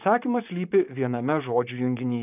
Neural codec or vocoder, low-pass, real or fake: vocoder, 44.1 kHz, 128 mel bands, Pupu-Vocoder; 3.6 kHz; fake